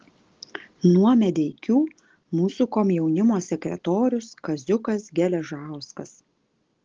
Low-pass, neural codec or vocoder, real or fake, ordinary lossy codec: 7.2 kHz; none; real; Opus, 16 kbps